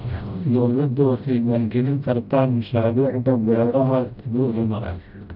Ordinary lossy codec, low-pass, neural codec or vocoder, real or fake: none; 5.4 kHz; codec, 16 kHz, 0.5 kbps, FreqCodec, smaller model; fake